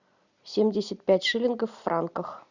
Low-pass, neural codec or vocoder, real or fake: 7.2 kHz; none; real